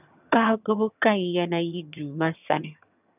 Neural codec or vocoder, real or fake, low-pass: vocoder, 22.05 kHz, 80 mel bands, HiFi-GAN; fake; 3.6 kHz